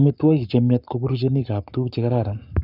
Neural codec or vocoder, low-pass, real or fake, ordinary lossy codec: codec, 44.1 kHz, 7.8 kbps, Pupu-Codec; 5.4 kHz; fake; none